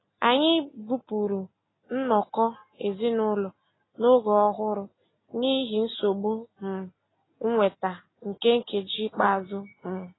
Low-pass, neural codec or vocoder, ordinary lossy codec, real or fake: 7.2 kHz; none; AAC, 16 kbps; real